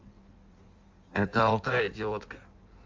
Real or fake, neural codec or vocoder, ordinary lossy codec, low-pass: fake; codec, 16 kHz in and 24 kHz out, 1.1 kbps, FireRedTTS-2 codec; Opus, 32 kbps; 7.2 kHz